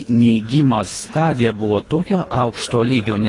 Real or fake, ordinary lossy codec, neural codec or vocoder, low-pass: fake; AAC, 32 kbps; codec, 24 kHz, 1.5 kbps, HILCodec; 10.8 kHz